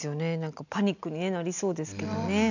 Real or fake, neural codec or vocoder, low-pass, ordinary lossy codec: real; none; 7.2 kHz; none